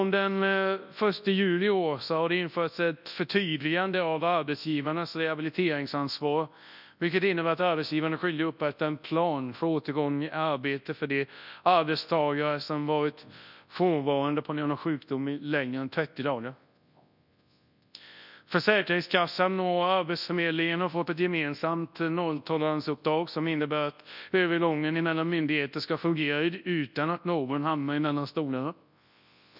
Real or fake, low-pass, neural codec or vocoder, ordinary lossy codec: fake; 5.4 kHz; codec, 24 kHz, 0.9 kbps, WavTokenizer, large speech release; none